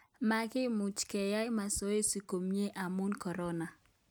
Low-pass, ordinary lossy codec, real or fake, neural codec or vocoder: none; none; real; none